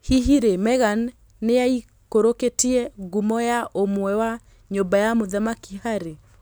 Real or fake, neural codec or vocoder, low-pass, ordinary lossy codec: real; none; none; none